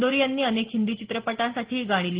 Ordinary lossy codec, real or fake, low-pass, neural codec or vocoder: Opus, 16 kbps; real; 3.6 kHz; none